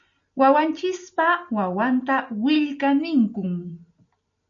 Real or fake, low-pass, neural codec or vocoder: real; 7.2 kHz; none